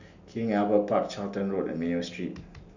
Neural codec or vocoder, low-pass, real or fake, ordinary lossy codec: none; 7.2 kHz; real; none